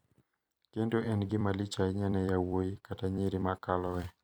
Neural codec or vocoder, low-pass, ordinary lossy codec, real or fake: vocoder, 44.1 kHz, 128 mel bands every 256 samples, BigVGAN v2; none; none; fake